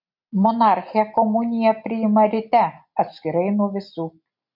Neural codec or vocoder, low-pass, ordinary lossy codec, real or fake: none; 5.4 kHz; MP3, 48 kbps; real